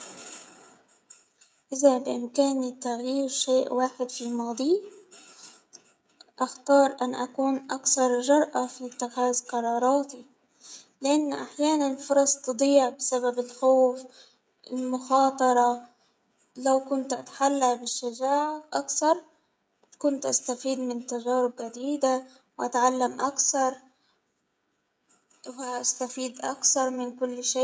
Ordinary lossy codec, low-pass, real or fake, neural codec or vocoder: none; none; fake; codec, 16 kHz, 16 kbps, FreqCodec, smaller model